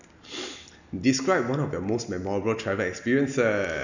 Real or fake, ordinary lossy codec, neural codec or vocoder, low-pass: real; none; none; 7.2 kHz